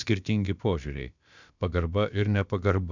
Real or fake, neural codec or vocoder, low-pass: fake; codec, 16 kHz, about 1 kbps, DyCAST, with the encoder's durations; 7.2 kHz